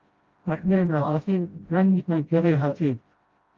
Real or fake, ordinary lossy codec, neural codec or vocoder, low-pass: fake; Opus, 32 kbps; codec, 16 kHz, 0.5 kbps, FreqCodec, smaller model; 7.2 kHz